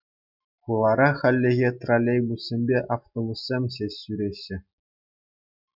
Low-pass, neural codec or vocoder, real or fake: 5.4 kHz; none; real